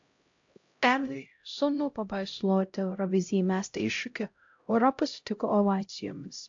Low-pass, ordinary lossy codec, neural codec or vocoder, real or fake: 7.2 kHz; AAC, 48 kbps; codec, 16 kHz, 0.5 kbps, X-Codec, HuBERT features, trained on LibriSpeech; fake